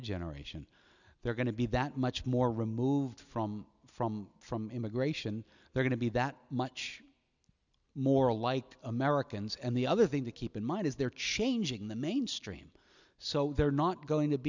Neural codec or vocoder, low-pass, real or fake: none; 7.2 kHz; real